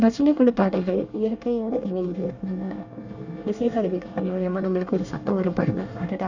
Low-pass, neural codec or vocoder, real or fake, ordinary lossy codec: 7.2 kHz; codec, 24 kHz, 1 kbps, SNAC; fake; none